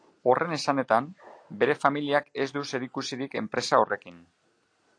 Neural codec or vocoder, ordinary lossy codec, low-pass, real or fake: none; AAC, 48 kbps; 9.9 kHz; real